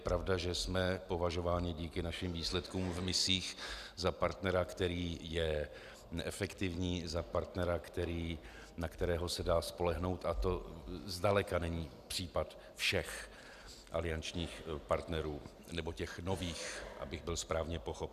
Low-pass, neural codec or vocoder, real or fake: 14.4 kHz; none; real